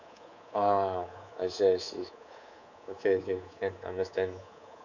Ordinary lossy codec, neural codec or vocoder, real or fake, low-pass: none; codec, 24 kHz, 3.1 kbps, DualCodec; fake; 7.2 kHz